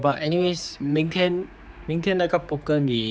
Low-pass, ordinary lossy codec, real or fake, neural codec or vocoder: none; none; fake; codec, 16 kHz, 4 kbps, X-Codec, HuBERT features, trained on general audio